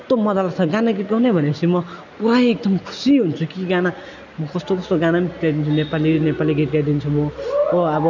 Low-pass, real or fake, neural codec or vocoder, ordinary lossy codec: 7.2 kHz; real; none; none